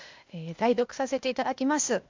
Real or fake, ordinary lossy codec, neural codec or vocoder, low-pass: fake; MP3, 64 kbps; codec, 16 kHz, 0.8 kbps, ZipCodec; 7.2 kHz